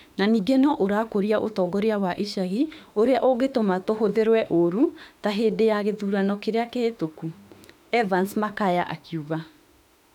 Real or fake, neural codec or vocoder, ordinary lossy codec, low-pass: fake; autoencoder, 48 kHz, 32 numbers a frame, DAC-VAE, trained on Japanese speech; none; 19.8 kHz